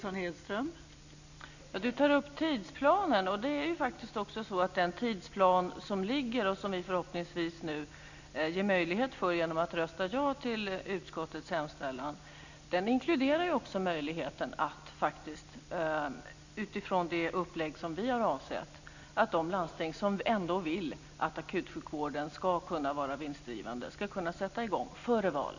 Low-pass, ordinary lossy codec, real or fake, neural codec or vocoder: 7.2 kHz; none; real; none